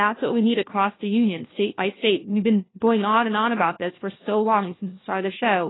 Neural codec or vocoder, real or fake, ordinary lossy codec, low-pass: codec, 16 kHz, 1 kbps, FunCodec, trained on LibriTTS, 50 frames a second; fake; AAC, 16 kbps; 7.2 kHz